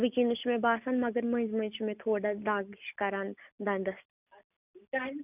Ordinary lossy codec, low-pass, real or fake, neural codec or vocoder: none; 3.6 kHz; real; none